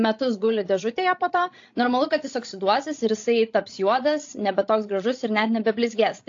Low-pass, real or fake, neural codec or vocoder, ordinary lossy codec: 7.2 kHz; fake; codec, 16 kHz, 16 kbps, FreqCodec, larger model; AAC, 48 kbps